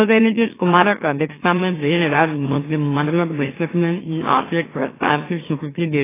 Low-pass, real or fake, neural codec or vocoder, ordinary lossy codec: 3.6 kHz; fake; autoencoder, 44.1 kHz, a latent of 192 numbers a frame, MeloTTS; AAC, 16 kbps